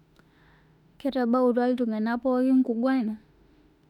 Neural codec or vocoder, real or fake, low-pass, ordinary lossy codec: autoencoder, 48 kHz, 32 numbers a frame, DAC-VAE, trained on Japanese speech; fake; 19.8 kHz; none